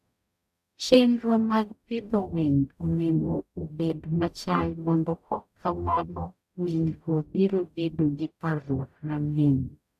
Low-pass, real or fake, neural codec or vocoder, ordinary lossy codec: 14.4 kHz; fake; codec, 44.1 kHz, 0.9 kbps, DAC; none